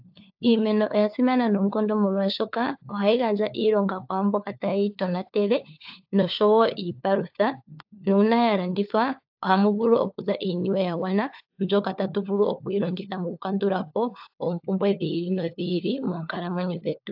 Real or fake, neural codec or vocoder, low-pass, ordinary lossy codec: fake; codec, 16 kHz, 4 kbps, FunCodec, trained on LibriTTS, 50 frames a second; 5.4 kHz; AAC, 48 kbps